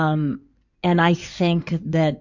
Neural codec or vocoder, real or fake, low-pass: codec, 16 kHz in and 24 kHz out, 2.2 kbps, FireRedTTS-2 codec; fake; 7.2 kHz